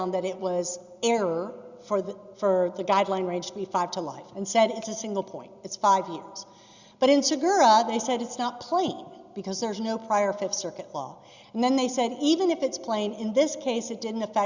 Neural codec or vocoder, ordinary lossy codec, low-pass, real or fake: none; Opus, 64 kbps; 7.2 kHz; real